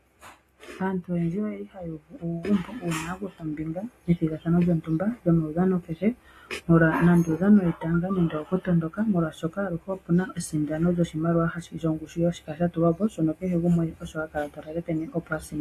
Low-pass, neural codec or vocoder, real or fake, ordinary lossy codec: 14.4 kHz; none; real; AAC, 48 kbps